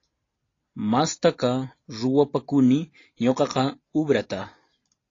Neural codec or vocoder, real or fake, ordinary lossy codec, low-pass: none; real; AAC, 32 kbps; 7.2 kHz